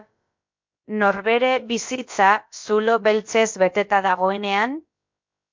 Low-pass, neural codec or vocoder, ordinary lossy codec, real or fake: 7.2 kHz; codec, 16 kHz, about 1 kbps, DyCAST, with the encoder's durations; MP3, 48 kbps; fake